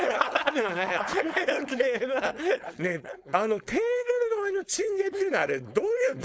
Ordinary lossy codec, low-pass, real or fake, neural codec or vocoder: none; none; fake; codec, 16 kHz, 4.8 kbps, FACodec